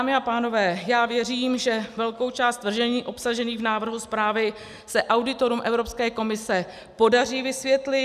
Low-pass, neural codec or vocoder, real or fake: 14.4 kHz; none; real